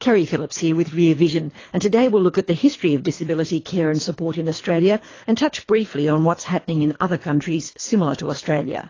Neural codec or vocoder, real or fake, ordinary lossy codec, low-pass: codec, 24 kHz, 3 kbps, HILCodec; fake; AAC, 32 kbps; 7.2 kHz